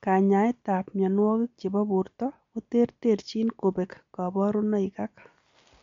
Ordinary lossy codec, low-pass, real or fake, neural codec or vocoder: MP3, 48 kbps; 7.2 kHz; real; none